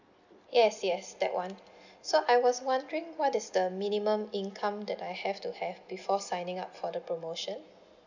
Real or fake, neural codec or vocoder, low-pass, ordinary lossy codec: real; none; 7.2 kHz; none